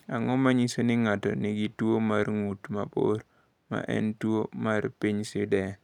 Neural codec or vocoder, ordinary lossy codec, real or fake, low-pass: none; none; real; 19.8 kHz